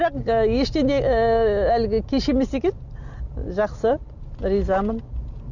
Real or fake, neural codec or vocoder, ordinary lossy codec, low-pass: real; none; Opus, 64 kbps; 7.2 kHz